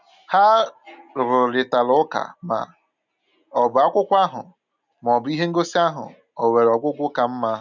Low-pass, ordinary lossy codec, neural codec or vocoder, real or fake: 7.2 kHz; none; none; real